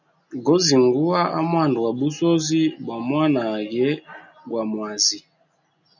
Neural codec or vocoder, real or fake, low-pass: none; real; 7.2 kHz